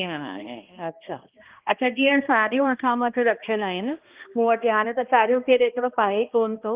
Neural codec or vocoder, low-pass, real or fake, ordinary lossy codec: codec, 16 kHz, 1 kbps, X-Codec, HuBERT features, trained on balanced general audio; 3.6 kHz; fake; Opus, 32 kbps